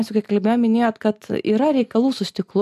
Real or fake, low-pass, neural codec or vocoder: real; 14.4 kHz; none